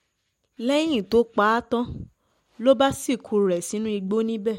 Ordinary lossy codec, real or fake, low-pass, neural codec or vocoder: MP3, 64 kbps; real; 19.8 kHz; none